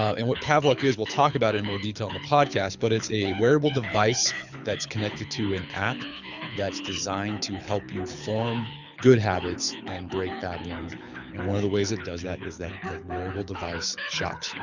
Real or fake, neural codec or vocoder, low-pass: fake; codec, 24 kHz, 6 kbps, HILCodec; 7.2 kHz